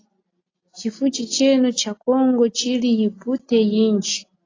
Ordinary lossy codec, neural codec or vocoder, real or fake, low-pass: AAC, 32 kbps; none; real; 7.2 kHz